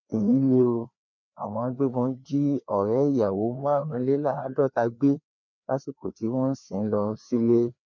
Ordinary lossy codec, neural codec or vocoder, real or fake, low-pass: none; codec, 16 kHz, 2 kbps, FreqCodec, larger model; fake; 7.2 kHz